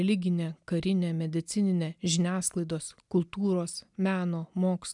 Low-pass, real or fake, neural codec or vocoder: 10.8 kHz; real; none